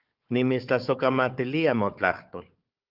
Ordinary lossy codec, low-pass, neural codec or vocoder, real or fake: Opus, 24 kbps; 5.4 kHz; codec, 16 kHz, 4 kbps, FunCodec, trained on Chinese and English, 50 frames a second; fake